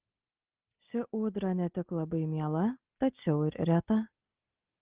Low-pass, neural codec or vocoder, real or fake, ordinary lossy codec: 3.6 kHz; none; real; Opus, 16 kbps